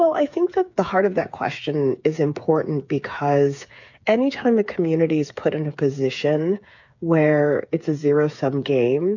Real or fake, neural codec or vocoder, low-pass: fake; codec, 16 kHz, 8 kbps, FreqCodec, smaller model; 7.2 kHz